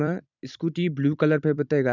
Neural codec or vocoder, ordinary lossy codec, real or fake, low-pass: vocoder, 44.1 kHz, 128 mel bands every 256 samples, BigVGAN v2; none; fake; 7.2 kHz